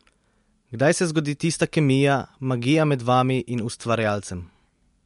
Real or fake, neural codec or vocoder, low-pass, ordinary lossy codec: real; none; 10.8 kHz; MP3, 64 kbps